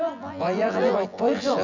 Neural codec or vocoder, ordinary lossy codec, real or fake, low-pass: vocoder, 24 kHz, 100 mel bands, Vocos; none; fake; 7.2 kHz